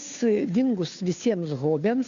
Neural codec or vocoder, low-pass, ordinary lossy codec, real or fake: codec, 16 kHz, 2 kbps, FunCodec, trained on Chinese and English, 25 frames a second; 7.2 kHz; AAC, 96 kbps; fake